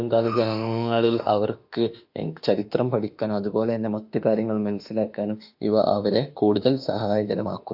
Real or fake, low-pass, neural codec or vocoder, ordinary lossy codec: fake; 5.4 kHz; autoencoder, 48 kHz, 32 numbers a frame, DAC-VAE, trained on Japanese speech; AAC, 48 kbps